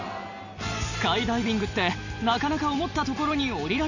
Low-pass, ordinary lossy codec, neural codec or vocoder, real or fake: 7.2 kHz; Opus, 64 kbps; none; real